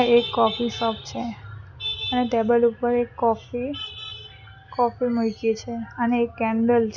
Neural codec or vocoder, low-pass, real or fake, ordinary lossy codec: none; 7.2 kHz; real; none